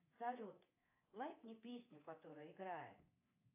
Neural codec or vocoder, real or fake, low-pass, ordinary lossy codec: codec, 24 kHz, 3.1 kbps, DualCodec; fake; 3.6 kHz; AAC, 24 kbps